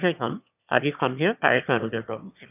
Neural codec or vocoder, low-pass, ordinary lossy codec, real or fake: autoencoder, 22.05 kHz, a latent of 192 numbers a frame, VITS, trained on one speaker; 3.6 kHz; none; fake